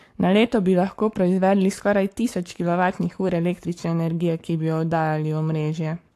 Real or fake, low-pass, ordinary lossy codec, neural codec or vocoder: fake; 14.4 kHz; AAC, 64 kbps; codec, 44.1 kHz, 7.8 kbps, Pupu-Codec